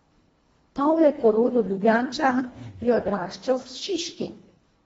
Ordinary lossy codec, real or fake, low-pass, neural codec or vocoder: AAC, 24 kbps; fake; 10.8 kHz; codec, 24 kHz, 1.5 kbps, HILCodec